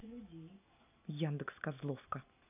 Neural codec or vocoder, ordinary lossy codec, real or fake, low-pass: none; none; real; 3.6 kHz